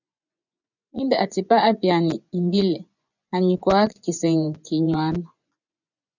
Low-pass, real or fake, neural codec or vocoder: 7.2 kHz; fake; vocoder, 24 kHz, 100 mel bands, Vocos